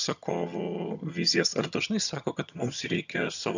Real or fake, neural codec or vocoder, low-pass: fake; vocoder, 22.05 kHz, 80 mel bands, HiFi-GAN; 7.2 kHz